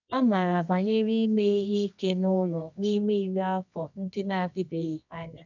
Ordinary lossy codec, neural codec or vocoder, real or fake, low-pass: none; codec, 24 kHz, 0.9 kbps, WavTokenizer, medium music audio release; fake; 7.2 kHz